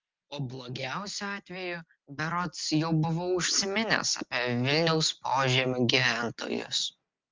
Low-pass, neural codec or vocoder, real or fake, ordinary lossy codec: 7.2 kHz; none; real; Opus, 24 kbps